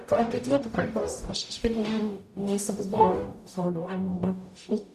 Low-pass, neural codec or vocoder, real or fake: 14.4 kHz; codec, 44.1 kHz, 0.9 kbps, DAC; fake